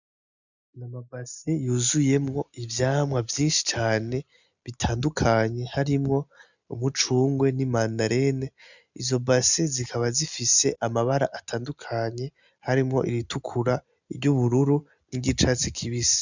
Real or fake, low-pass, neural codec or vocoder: real; 7.2 kHz; none